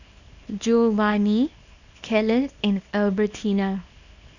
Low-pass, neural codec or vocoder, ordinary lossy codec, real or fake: 7.2 kHz; codec, 24 kHz, 0.9 kbps, WavTokenizer, small release; none; fake